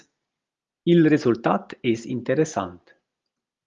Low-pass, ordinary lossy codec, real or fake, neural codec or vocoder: 7.2 kHz; Opus, 32 kbps; real; none